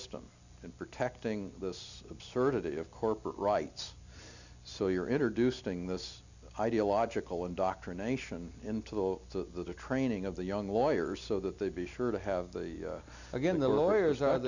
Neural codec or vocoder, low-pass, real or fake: none; 7.2 kHz; real